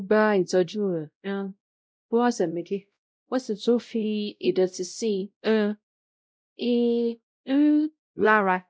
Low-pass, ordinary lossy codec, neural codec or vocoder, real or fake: none; none; codec, 16 kHz, 0.5 kbps, X-Codec, WavLM features, trained on Multilingual LibriSpeech; fake